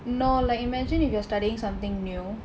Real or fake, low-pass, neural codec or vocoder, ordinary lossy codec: real; none; none; none